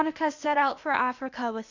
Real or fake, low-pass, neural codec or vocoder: fake; 7.2 kHz; codec, 16 kHz, 0.8 kbps, ZipCodec